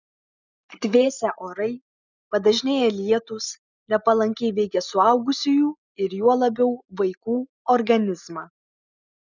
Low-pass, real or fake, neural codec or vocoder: 7.2 kHz; real; none